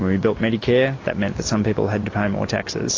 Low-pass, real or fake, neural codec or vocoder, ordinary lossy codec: 7.2 kHz; real; none; AAC, 32 kbps